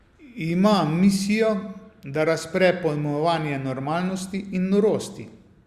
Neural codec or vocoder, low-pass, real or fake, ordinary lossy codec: none; 14.4 kHz; real; Opus, 64 kbps